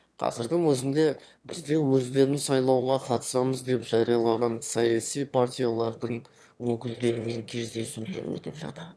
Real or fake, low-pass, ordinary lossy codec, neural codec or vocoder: fake; none; none; autoencoder, 22.05 kHz, a latent of 192 numbers a frame, VITS, trained on one speaker